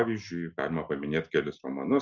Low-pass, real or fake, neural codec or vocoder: 7.2 kHz; real; none